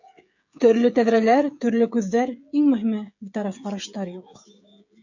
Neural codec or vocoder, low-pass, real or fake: codec, 16 kHz, 16 kbps, FreqCodec, smaller model; 7.2 kHz; fake